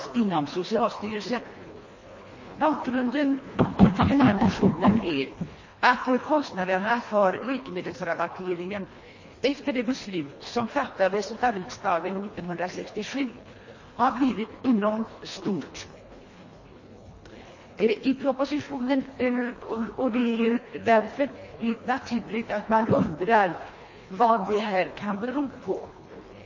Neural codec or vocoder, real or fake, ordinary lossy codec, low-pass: codec, 24 kHz, 1.5 kbps, HILCodec; fake; MP3, 32 kbps; 7.2 kHz